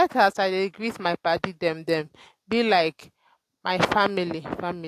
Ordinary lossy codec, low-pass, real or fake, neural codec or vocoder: AAC, 64 kbps; 14.4 kHz; real; none